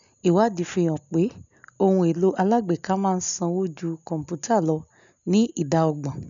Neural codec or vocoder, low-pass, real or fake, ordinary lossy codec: none; 7.2 kHz; real; none